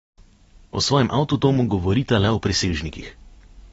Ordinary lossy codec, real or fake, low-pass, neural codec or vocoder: AAC, 24 kbps; real; 19.8 kHz; none